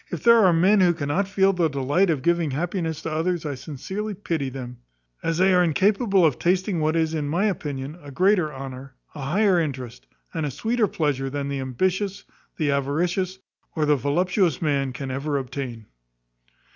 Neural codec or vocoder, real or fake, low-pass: none; real; 7.2 kHz